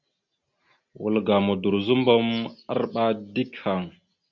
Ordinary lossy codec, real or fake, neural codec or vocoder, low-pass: Opus, 64 kbps; real; none; 7.2 kHz